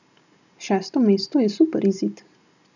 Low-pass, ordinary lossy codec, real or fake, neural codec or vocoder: 7.2 kHz; none; real; none